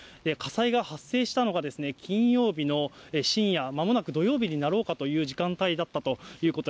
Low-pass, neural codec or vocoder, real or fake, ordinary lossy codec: none; none; real; none